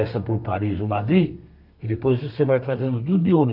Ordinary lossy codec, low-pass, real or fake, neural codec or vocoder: none; 5.4 kHz; fake; codec, 32 kHz, 1.9 kbps, SNAC